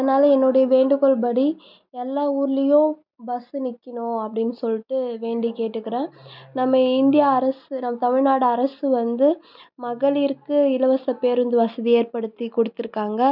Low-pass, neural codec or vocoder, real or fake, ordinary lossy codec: 5.4 kHz; none; real; none